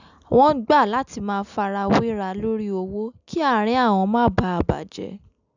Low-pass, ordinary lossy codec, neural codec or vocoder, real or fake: 7.2 kHz; none; none; real